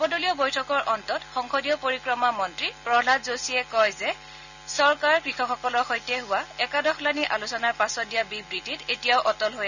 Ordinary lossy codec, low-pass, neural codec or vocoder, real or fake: none; 7.2 kHz; none; real